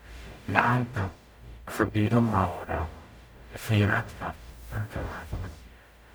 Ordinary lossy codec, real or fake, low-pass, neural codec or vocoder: none; fake; none; codec, 44.1 kHz, 0.9 kbps, DAC